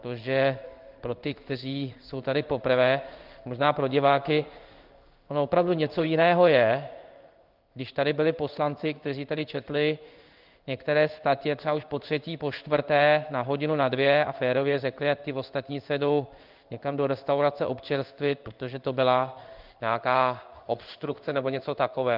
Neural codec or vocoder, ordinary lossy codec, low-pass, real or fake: codec, 16 kHz in and 24 kHz out, 1 kbps, XY-Tokenizer; Opus, 24 kbps; 5.4 kHz; fake